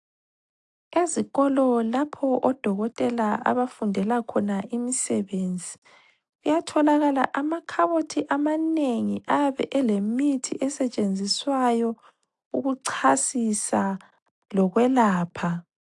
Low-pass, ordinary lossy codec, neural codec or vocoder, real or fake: 10.8 kHz; AAC, 64 kbps; none; real